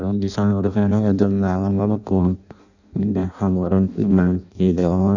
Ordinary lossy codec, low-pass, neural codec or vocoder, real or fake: none; 7.2 kHz; codec, 16 kHz in and 24 kHz out, 0.6 kbps, FireRedTTS-2 codec; fake